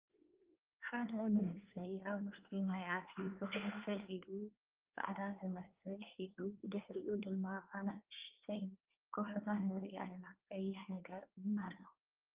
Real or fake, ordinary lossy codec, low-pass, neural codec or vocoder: fake; Opus, 32 kbps; 3.6 kHz; codec, 24 kHz, 1 kbps, SNAC